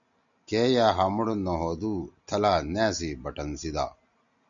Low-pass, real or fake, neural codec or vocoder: 7.2 kHz; real; none